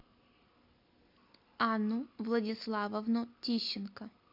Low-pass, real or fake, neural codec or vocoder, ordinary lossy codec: 5.4 kHz; real; none; MP3, 48 kbps